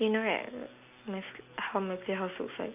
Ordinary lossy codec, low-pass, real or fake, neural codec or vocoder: AAC, 32 kbps; 3.6 kHz; real; none